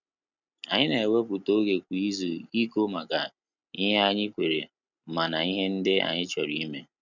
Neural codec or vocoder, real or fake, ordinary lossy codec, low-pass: none; real; none; 7.2 kHz